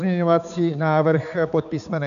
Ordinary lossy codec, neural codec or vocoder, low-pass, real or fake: MP3, 96 kbps; codec, 16 kHz, 4 kbps, X-Codec, HuBERT features, trained on balanced general audio; 7.2 kHz; fake